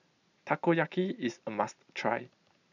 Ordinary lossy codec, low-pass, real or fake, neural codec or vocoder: none; 7.2 kHz; real; none